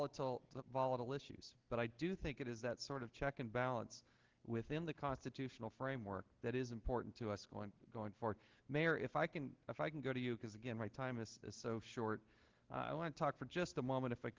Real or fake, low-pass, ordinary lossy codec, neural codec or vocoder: real; 7.2 kHz; Opus, 16 kbps; none